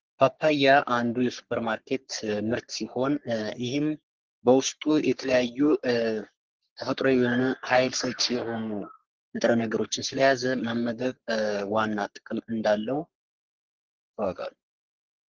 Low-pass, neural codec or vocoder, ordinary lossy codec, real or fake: 7.2 kHz; codec, 44.1 kHz, 3.4 kbps, Pupu-Codec; Opus, 16 kbps; fake